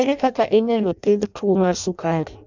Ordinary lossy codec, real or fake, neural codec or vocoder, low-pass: none; fake; codec, 16 kHz in and 24 kHz out, 0.6 kbps, FireRedTTS-2 codec; 7.2 kHz